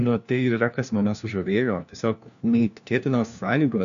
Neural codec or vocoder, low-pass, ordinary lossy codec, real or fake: codec, 16 kHz, 1 kbps, FunCodec, trained on LibriTTS, 50 frames a second; 7.2 kHz; MP3, 96 kbps; fake